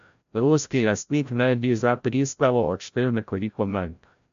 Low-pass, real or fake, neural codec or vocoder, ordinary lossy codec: 7.2 kHz; fake; codec, 16 kHz, 0.5 kbps, FreqCodec, larger model; MP3, 64 kbps